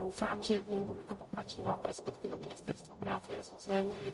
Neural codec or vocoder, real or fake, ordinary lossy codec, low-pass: codec, 44.1 kHz, 0.9 kbps, DAC; fake; MP3, 48 kbps; 14.4 kHz